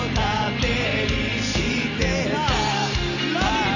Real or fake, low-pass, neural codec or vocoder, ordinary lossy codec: real; 7.2 kHz; none; none